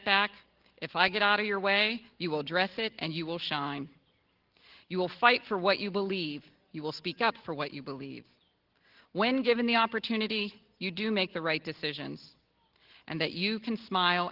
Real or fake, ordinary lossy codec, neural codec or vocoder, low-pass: real; Opus, 16 kbps; none; 5.4 kHz